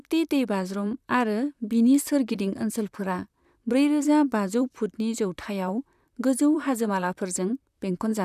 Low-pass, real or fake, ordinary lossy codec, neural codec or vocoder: 14.4 kHz; fake; none; vocoder, 44.1 kHz, 128 mel bands, Pupu-Vocoder